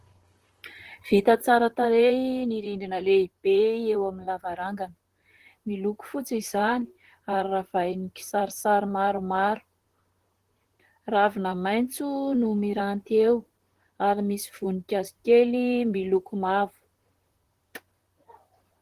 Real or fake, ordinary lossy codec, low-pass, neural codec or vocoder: fake; Opus, 16 kbps; 14.4 kHz; vocoder, 44.1 kHz, 128 mel bands, Pupu-Vocoder